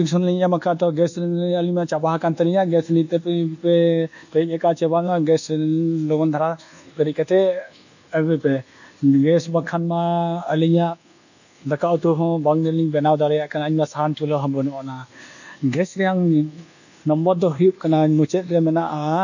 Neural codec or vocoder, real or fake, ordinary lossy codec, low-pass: codec, 24 kHz, 1.2 kbps, DualCodec; fake; none; 7.2 kHz